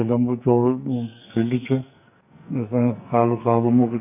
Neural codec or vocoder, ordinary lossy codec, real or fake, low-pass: codec, 44.1 kHz, 2.6 kbps, SNAC; none; fake; 3.6 kHz